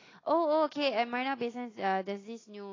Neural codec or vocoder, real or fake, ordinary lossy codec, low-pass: none; real; AAC, 32 kbps; 7.2 kHz